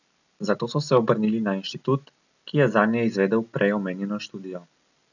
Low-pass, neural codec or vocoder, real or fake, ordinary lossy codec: 7.2 kHz; none; real; none